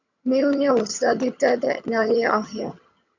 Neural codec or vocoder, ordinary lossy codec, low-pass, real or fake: vocoder, 22.05 kHz, 80 mel bands, HiFi-GAN; MP3, 64 kbps; 7.2 kHz; fake